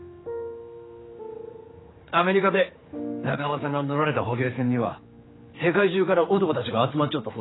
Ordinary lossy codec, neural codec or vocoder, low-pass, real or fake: AAC, 16 kbps; codec, 16 kHz, 4 kbps, X-Codec, HuBERT features, trained on general audio; 7.2 kHz; fake